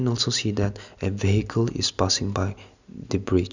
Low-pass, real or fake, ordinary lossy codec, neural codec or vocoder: 7.2 kHz; real; none; none